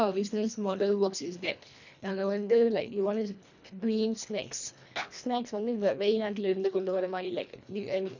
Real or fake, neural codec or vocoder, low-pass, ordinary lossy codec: fake; codec, 24 kHz, 1.5 kbps, HILCodec; 7.2 kHz; none